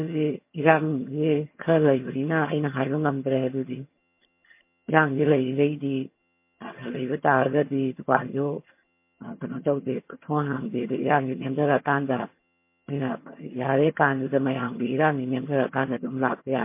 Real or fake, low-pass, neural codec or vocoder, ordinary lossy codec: fake; 3.6 kHz; vocoder, 22.05 kHz, 80 mel bands, HiFi-GAN; MP3, 24 kbps